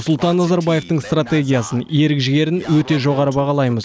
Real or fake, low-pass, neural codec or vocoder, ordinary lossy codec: real; none; none; none